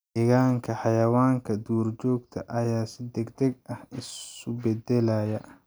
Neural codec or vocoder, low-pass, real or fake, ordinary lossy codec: none; none; real; none